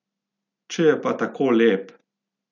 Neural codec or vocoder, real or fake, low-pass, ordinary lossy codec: none; real; 7.2 kHz; none